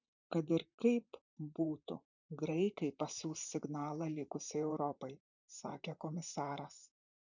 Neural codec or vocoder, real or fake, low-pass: vocoder, 44.1 kHz, 128 mel bands, Pupu-Vocoder; fake; 7.2 kHz